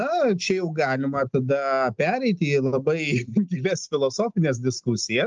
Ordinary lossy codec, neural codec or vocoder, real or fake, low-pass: Opus, 24 kbps; none; real; 7.2 kHz